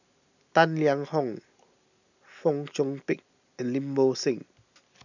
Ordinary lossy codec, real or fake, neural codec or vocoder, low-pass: none; real; none; 7.2 kHz